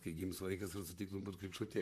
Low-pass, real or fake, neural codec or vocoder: 14.4 kHz; fake; vocoder, 44.1 kHz, 128 mel bands, Pupu-Vocoder